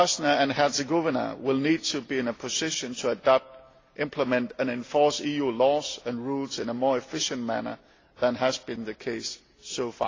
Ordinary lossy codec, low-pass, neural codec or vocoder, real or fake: AAC, 32 kbps; 7.2 kHz; none; real